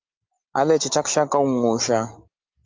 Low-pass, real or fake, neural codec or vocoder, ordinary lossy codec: 7.2 kHz; real; none; Opus, 24 kbps